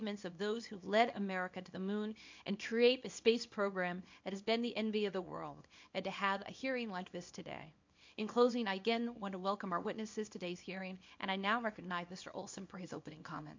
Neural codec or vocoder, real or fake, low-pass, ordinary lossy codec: codec, 24 kHz, 0.9 kbps, WavTokenizer, small release; fake; 7.2 kHz; MP3, 48 kbps